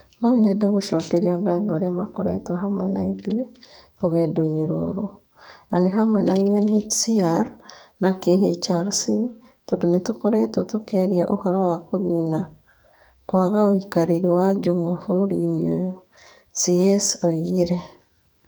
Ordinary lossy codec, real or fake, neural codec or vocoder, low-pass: none; fake; codec, 44.1 kHz, 2.6 kbps, SNAC; none